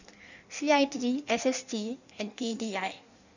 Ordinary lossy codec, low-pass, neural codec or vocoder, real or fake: none; 7.2 kHz; codec, 16 kHz in and 24 kHz out, 1.1 kbps, FireRedTTS-2 codec; fake